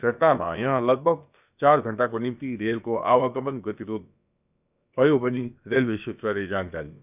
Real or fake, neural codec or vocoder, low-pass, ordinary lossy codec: fake; codec, 16 kHz, about 1 kbps, DyCAST, with the encoder's durations; 3.6 kHz; none